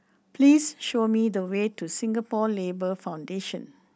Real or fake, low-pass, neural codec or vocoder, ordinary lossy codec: real; none; none; none